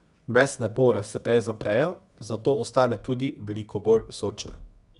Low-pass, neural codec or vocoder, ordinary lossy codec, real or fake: 10.8 kHz; codec, 24 kHz, 0.9 kbps, WavTokenizer, medium music audio release; none; fake